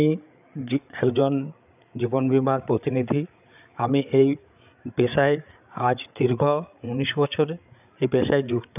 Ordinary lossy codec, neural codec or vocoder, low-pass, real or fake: none; codec, 16 kHz, 8 kbps, FreqCodec, larger model; 3.6 kHz; fake